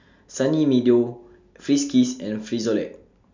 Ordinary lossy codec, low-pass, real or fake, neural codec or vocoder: MP3, 64 kbps; 7.2 kHz; real; none